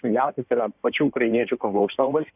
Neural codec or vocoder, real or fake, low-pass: codec, 16 kHz in and 24 kHz out, 1.1 kbps, FireRedTTS-2 codec; fake; 3.6 kHz